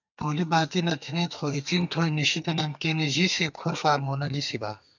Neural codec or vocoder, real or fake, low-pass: codec, 32 kHz, 1.9 kbps, SNAC; fake; 7.2 kHz